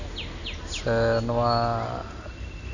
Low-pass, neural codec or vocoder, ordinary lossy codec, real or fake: 7.2 kHz; none; none; real